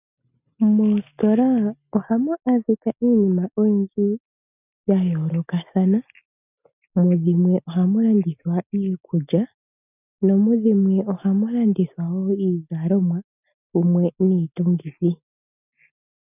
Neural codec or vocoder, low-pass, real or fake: none; 3.6 kHz; real